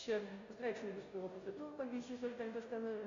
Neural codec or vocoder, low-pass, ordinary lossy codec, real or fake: codec, 16 kHz, 0.5 kbps, FunCodec, trained on Chinese and English, 25 frames a second; 7.2 kHz; AAC, 64 kbps; fake